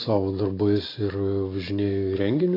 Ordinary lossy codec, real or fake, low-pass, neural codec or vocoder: AAC, 24 kbps; real; 5.4 kHz; none